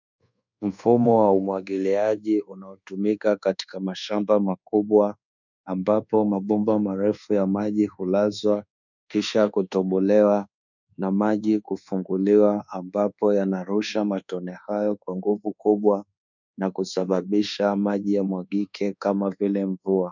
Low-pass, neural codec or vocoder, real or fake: 7.2 kHz; codec, 24 kHz, 1.2 kbps, DualCodec; fake